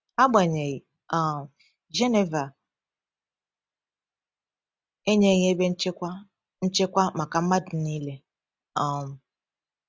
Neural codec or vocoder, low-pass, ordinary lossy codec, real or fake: none; none; none; real